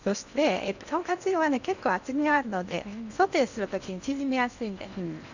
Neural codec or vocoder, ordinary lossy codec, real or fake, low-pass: codec, 16 kHz in and 24 kHz out, 0.8 kbps, FocalCodec, streaming, 65536 codes; none; fake; 7.2 kHz